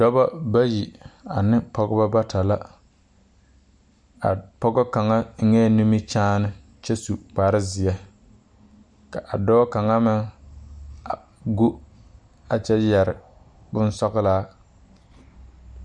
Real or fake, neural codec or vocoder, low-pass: real; none; 9.9 kHz